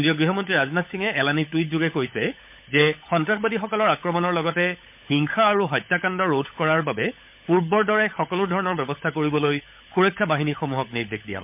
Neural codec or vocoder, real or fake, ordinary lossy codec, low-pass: codec, 16 kHz, 16 kbps, FunCodec, trained on LibriTTS, 50 frames a second; fake; MP3, 32 kbps; 3.6 kHz